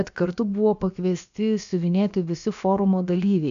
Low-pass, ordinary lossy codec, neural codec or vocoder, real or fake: 7.2 kHz; AAC, 96 kbps; codec, 16 kHz, about 1 kbps, DyCAST, with the encoder's durations; fake